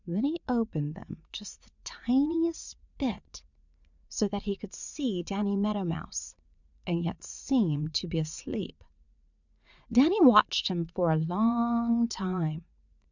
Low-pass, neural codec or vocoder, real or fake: 7.2 kHz; vocoder, 44.1 kHz, 80 mel bands, Vocos; fake